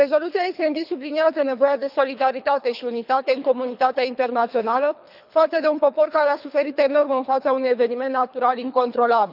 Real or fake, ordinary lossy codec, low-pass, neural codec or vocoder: fake; none; 5.4 kHz; codec, 24 kHz, 3 kbps, HILCodec